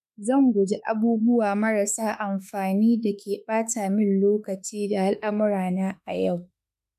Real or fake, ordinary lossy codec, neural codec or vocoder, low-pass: fake; none; autoencoder, 48 kHz, 32 numbers a frame, DAC-VAE, trained on Japanese speech; 14.4 kHz